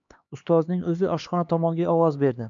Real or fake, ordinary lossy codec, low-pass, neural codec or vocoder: fake; AAC, 64 kbps; 7.2 kHz; codec, 16 kHz, 2 kbps, X-Codec, HuBERT features, trained on LibriSpeech